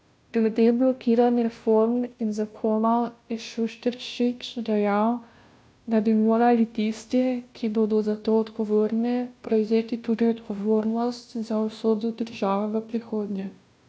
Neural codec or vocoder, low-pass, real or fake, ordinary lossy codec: codec, 16 kHz, 0.5 kbps, FunCodec, trained on Chinese and English, 25 frames a second; none; fake; none